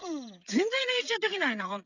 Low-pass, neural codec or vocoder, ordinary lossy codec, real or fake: 7.2 kHz; codec, 16 kHz, 8 kbps, FreqCodec, smaller model; none; fake